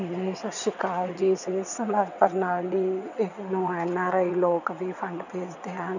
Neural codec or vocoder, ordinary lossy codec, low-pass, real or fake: vocoder, 22.05 kHz, 80 mel bands, WaveNeXt; none; 7.2 kHz; fake